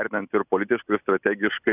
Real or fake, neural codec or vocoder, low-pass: real; none; 3.6 kHz